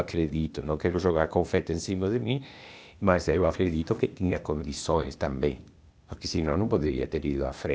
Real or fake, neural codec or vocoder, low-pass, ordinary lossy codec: fake; codec, 16 kHz, 0.8 kbps, ZipCodec; none; none